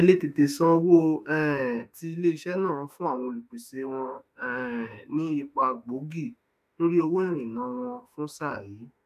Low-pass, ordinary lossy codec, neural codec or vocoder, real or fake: 14.4 kHz; none; autoencoder, 48 kHz, 32 numbers a frame, DAC-VAE, trained on Japanese speech; fake